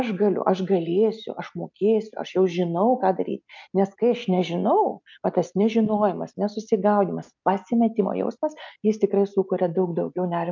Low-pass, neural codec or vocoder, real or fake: 7.2 kHz; none; real